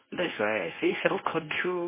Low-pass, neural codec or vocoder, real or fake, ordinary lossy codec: 3.6 kHz; codec, 24 kHz, 0.9 kbps, WavTokenizer, medium speech release version 1; fake; MP3, 16 kbps